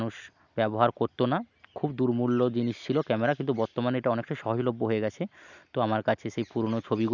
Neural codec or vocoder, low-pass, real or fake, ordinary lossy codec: none; 7.2 kHz; real; none